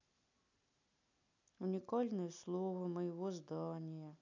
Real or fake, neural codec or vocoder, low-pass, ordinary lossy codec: real; none; 7.2 kHz; none